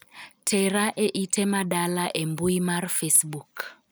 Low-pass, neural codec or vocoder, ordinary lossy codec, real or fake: none; none; none; real